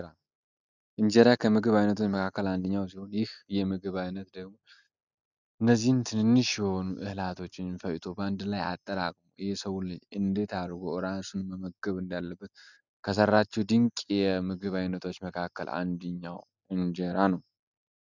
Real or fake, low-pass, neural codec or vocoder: real; 7.2 kHz; none